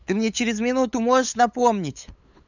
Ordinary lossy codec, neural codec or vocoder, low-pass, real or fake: none; codec, 16 kHz, 8 kbps, FunCodec, trained on LibriTTS, 25 frames a second; 7.2 kHz; fake